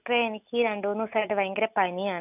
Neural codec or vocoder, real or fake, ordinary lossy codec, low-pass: none; real; none; 3.6 kHz